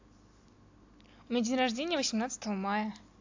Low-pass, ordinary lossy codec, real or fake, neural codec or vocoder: 7.2 kHz; AAC, 48 kbps; real; none